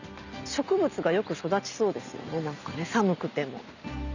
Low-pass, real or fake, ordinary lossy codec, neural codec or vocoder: 7.2 kHz; real; none; none